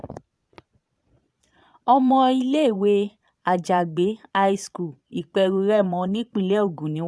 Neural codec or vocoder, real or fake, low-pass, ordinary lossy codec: vocoder, 22.05 kHz, 80 mel bands, Vocos; fake; none; none